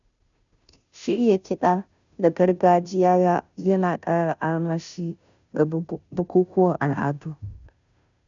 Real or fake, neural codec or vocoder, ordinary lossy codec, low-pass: fake; codec, 16 kHz, 0.5 kbps, FunCodec, trained on Chinese and English, 25 frames a second; none; 7.2 kHz